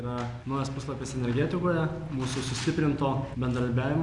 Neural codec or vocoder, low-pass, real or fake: none; 10.8 kHz; real